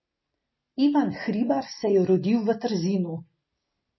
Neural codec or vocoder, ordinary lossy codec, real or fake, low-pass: none; MP3, 24 kbps; real; 7.2 kHz